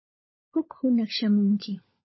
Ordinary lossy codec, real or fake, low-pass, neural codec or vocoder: MP3, 24 kbps; fake; 7.2 kHz; codec, 16 kHz, 16 kbps, FunCodec, trained on LibriTTS, 50 frames a second